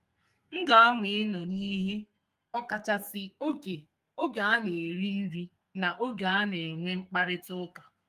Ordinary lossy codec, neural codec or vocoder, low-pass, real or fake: Opus, 32 kbps; codec, 32 kHz, 1.9 kbps, SNAC; 14.4 kHz; fake